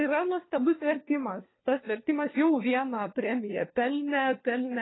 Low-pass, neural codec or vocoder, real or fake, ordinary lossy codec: 7.2 kHz; codec, 16 kHz, 4 kbps, FunCodec, trained on LibriTTS, 50 frames a second; fake; AAC, 16 kbps